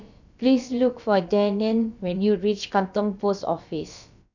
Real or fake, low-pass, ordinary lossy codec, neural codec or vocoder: fake; 7.2 kHz; none; codec, 16 kHz, about 1 kbps, DyCAST, with the encoder's durations